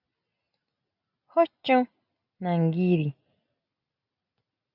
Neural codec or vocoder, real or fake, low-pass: none; real; 5.4 kHz